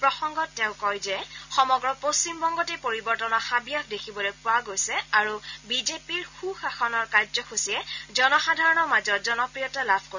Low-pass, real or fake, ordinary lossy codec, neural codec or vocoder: 7.2 kHz; real; none; none